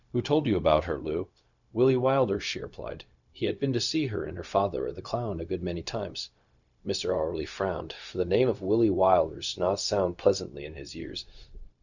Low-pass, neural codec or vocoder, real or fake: 7.2 kHz; codec, 16 kHz, 0.4 kbps, LongCat-Audio-Codec; fake